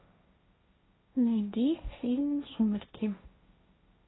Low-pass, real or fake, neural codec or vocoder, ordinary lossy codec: 7.2 kHz; fake; codec, 16 kHz, 1.1 kbps, Voila-Tokenizer; AAC, 16 kbps